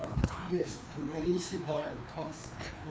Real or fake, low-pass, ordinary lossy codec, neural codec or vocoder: fake; none; none; codec, 16 kHz, 2 kbps, FreqCodec, larger model